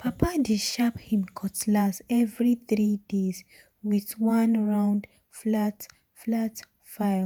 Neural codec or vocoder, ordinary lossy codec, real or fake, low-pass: vocoder, 48 kHz, 128 mel bands, Vocos; none; fake; none